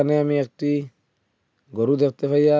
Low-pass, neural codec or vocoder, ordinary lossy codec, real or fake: none; none; none; real